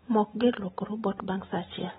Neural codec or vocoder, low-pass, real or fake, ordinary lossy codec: none; 19.8 kHz; real; AAC, 16 kbps